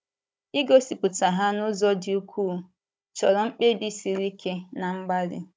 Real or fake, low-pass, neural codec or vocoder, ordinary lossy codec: fake; none; codec, 16 kHz, 16 kbps, FunCodec, trained on Chinese and English, 50 frames a second; none